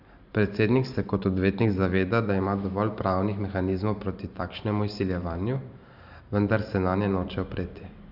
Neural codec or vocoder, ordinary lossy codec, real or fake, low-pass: vocoder, 44.1 kHz, 128 mel bands every 512 samples, BigVGAN v2; none; fake; 5.4 kHz